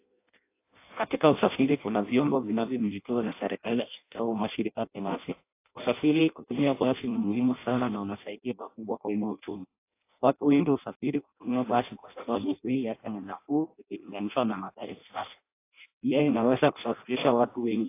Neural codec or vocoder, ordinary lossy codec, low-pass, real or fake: codec, 16 kHz in and 24 kHz out, 0.6 kbps, FireRedTTS-2 codec; AAC, 24 kbps; 3.6 kHz; fake